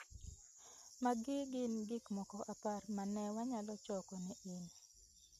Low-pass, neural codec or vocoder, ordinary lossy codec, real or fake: 14.4 kHz; none; MP3, 64 kbps; real